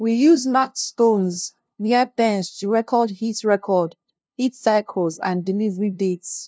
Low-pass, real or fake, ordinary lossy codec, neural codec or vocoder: none; fake; none; codec, 16 kHz, 0.5 kbps, FunCodec, trained on LibriTTS, 25 frames a second